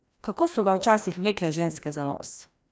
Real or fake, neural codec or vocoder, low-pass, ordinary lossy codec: fake; codec, 16 kHz, 1 kbps, FreqCodec, larger model; none; none